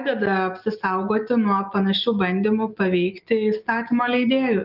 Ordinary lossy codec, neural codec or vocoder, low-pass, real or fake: Opus, 24 kbps; none; 5.4 kHz; real